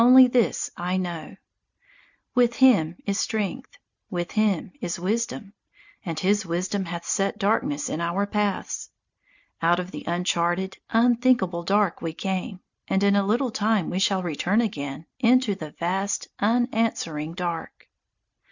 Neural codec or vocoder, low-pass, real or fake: none; 7.2 kHz; real